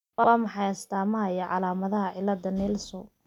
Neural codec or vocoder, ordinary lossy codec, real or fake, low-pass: none; none; real; 19.8 kHz